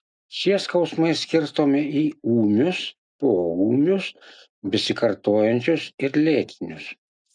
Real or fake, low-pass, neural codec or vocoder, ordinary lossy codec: real; 9.9 kHz; none; MP3, 96 kbps